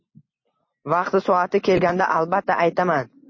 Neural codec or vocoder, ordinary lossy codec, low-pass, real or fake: none; MP3, 32 kbps; 7.2 kHz; real